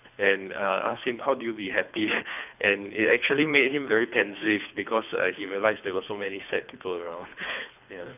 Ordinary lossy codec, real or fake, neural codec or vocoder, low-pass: none; fake; codec, 24 kHz, 3 kbps, HILCodec; 3.6 kHz